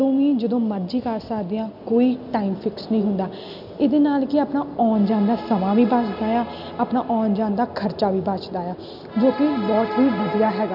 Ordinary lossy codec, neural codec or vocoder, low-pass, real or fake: none; none; 5.4 kHz; real